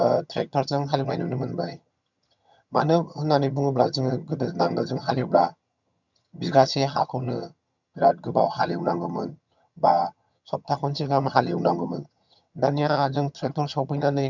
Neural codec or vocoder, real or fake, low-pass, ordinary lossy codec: vocoder, 22.05 kHz, 80 mel bands, HiFi-GAN; fake; 7.2 kHz; none